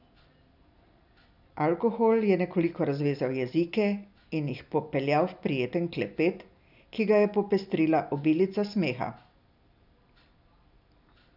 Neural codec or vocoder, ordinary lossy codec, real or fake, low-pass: none; none; real; 5.4 kHz